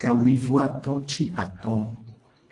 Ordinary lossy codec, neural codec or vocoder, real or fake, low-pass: AAC, 48 kbps; codec, 24 kHz, 1.5 kbps, HILCodec; fake; 10.8 kHz